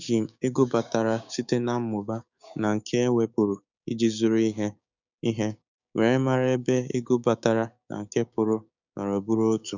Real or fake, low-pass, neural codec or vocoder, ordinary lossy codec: fake; 7.2 kHz; codec, 16 kHz, 6 kbps, DAC; none